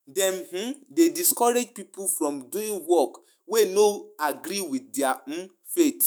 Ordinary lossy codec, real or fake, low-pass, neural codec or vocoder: none; fake; none; autoencoder, 48 kHz, 128 numbers a frame, DAC-VAE, trained on Japanese speech